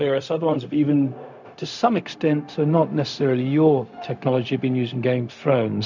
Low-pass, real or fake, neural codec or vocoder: 7.2 kHz; fake; codec, 16 kHz, 0.4 kbps, LongCat-Audio-Codec